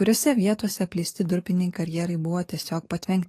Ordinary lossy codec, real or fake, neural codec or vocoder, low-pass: AAC, 48 kbps; fake; codec, 44.1 kHz, 7.8 kbps, DAC; 14.4 kHz